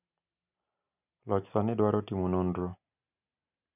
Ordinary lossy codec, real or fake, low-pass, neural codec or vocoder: none; real; 3.6 kHz; none